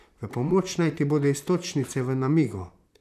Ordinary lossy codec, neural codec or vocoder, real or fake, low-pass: none; vocoder, 44.1 kHz, 128 mel bands, Pupu-Vocoder; fake; 14.4 kHz